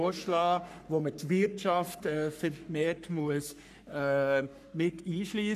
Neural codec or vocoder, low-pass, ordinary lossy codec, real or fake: codec, 44.1 kHz, 3.4 kbps, Pupu-Codec; 14.4 kHz; none; fake